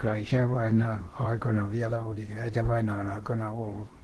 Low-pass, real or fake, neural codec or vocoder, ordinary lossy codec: 10.8 kHz; fake; codec, 16 kHz in and 24 kHz out, 0.8 kbps, FocalCodec, streaming, 65536 codes; Opus, 16 kbps